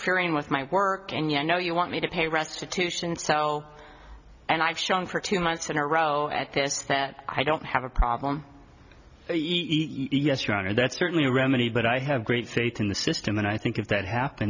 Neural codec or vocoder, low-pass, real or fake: none; 7.2 kHz; real